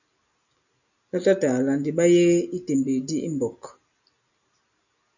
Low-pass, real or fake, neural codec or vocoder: 7.2 kHz; real; none